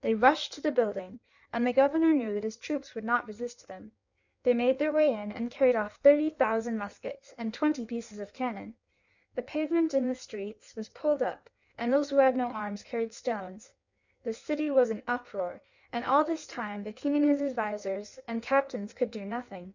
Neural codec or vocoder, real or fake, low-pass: codec, 16 kHz in and 24 kHz out, 1.1 kbps, FireRedTTS-2 codec; fake; 7.2 kHz